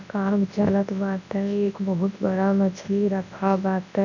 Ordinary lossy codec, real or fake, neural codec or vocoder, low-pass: none; fake; codec, 24 kHz, 0.9 kbps, WavTokenizer, large speech release; 7.2 kHz